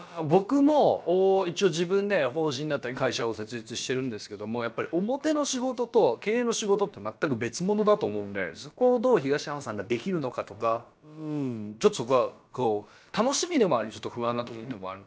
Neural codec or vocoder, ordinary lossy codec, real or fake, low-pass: codec, 16 kHz, about 1 kbps, DyCAST, with the encoder's durations; none; fake; none